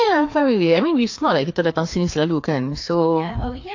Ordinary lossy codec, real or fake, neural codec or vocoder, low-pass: AAC, 48 kbps; fake; codec, 16 kHz, 2 kbps, FreqCodec, larger model; 7.2 kHz